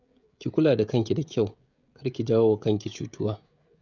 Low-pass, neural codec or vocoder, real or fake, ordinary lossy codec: 7.2 kHz; codec, 16 kHz, 16 kbps, FreqCodec, smaller model; fake; none